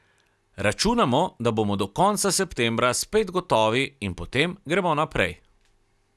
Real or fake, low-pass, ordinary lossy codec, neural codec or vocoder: real; none; none; none